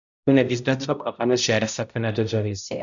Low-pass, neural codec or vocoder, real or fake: 7.2 kHz; codec, 16 kHz, 0.5 kbps, X-Codec, HuBERT features, trained on balanced general audio; fake